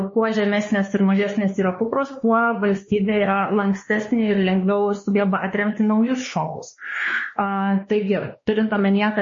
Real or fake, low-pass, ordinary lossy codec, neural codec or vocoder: fake; 7.2 kHz; MP3, 32 kbps; codec, 16 kHz, 2 kbps, X-Codec, WavLM features, trained on Multilingual LibriSpeech